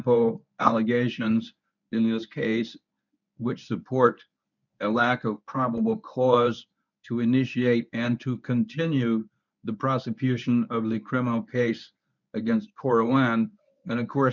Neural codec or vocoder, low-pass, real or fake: codec, 24 kHz, 0.9 kbps, WavTokenizer, medium speech release version 1; 7.2 kHz; fake